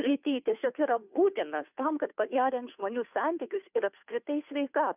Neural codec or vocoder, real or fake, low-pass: codec, 16 kHz, 2 kbps, FunCodec, trained on Chinese and English, 25 frames a second; fake; 3.6 kHz